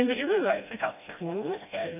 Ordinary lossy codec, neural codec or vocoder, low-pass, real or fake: none; codec, 16 kHz, 1 kbps, FreqCodec, smaller model; 3.6 kHz; fake